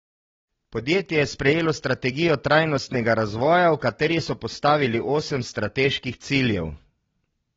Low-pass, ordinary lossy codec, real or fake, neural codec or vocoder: 7.2 kHz; AAC, 24 kbps; real; none